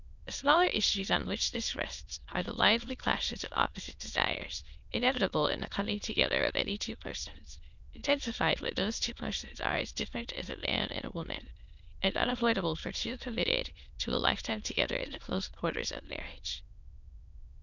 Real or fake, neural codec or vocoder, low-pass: fake; autoencoder, 22.05 kHz, a latent of 192 numbers a frame, VITS, trained on many speakers; 7.2 kHz